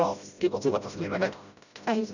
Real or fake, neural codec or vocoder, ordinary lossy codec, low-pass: fake; codec, 16 kHz, 0.5 kbps, FreqCodec, smaller model; none; 7.2 kHz